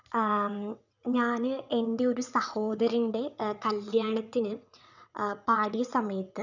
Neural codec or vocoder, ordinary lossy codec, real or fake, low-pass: vocoder, 22.05 kHz, 80 mel bands, WaveNeXt; none; fake; 7.2 kHz